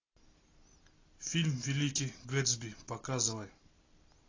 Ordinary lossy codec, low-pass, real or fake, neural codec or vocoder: AAC, 32 kbps; 7.2 kHz; real; none